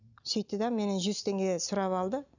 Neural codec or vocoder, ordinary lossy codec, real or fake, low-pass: none; none; real; 7.2 kHz